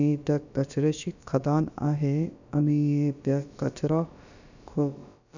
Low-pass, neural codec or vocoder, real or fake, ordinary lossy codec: 7.2 kHz; codec, 16 kHz, about 1 kbps, DyCAST, with the encoder's durations; fake; none